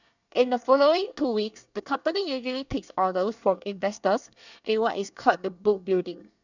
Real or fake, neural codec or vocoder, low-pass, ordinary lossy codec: fake; codec, 24 kHz, 1 kbps, SNAC; 7.2 kHz; none